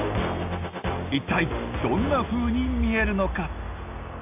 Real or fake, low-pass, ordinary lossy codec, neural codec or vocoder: real; 3.6 kHz; AAC, 32 kbps; none